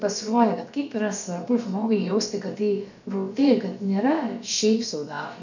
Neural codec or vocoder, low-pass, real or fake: codec, 16 kHz, about 1 kbps, DyCAST, with the encoder's durations; 7.2 kHz; fake